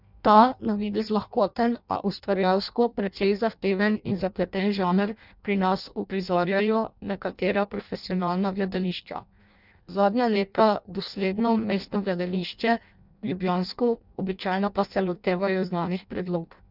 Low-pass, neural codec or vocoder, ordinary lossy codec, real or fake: 5.4 kHz; codec, 16 kHz in and 24 kHz out, 0.6 kbps, FireRedTTS-2 codec; none; fake